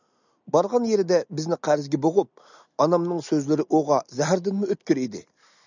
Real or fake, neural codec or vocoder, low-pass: real; none; 7.2 kHz